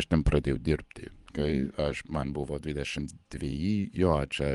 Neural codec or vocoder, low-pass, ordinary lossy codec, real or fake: vocoder, 24 kHz, 100 mel bands, Vocos; 10.8 kHz; Opus, 32 kbps; fake